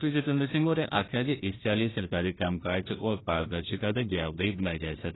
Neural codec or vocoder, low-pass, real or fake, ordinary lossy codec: codec, 16 kHz, 1 kbps, FunCodec, trained on Chinese and English, 50 frames a second; 7.2 kHz; fake; AAC, 16 kbps